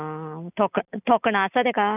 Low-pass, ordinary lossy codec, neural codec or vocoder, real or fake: 3.6 kHz; none; none; real